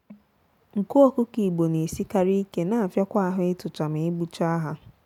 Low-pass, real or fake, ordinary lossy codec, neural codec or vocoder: 19.8 kHz; real; none; none